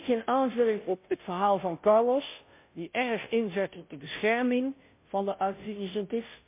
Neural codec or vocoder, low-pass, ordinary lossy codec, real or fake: codec, 16 kHz, 0.5 kbps, FunCodec, trained on Chinese and English, 25 frames a second; 3.6 kHz; MP3, 24 kbps; fake